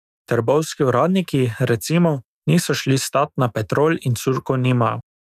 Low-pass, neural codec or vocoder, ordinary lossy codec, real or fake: 14.4 kHz; autoencoder, 48 kHz, 128 numbers a frame, DAC-VAE, trained on Japanese speech; none; fake